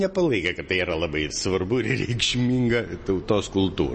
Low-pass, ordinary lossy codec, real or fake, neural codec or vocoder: 10.8 kHz; MP3, 32 kbps; real; none